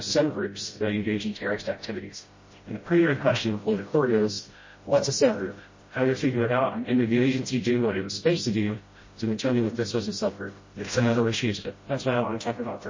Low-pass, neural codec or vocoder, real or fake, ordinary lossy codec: 7.2 kHz; codec, 16 kHz, 0.5 kbps, FreqCodec, smaller model; fake; MP3, 32 kbps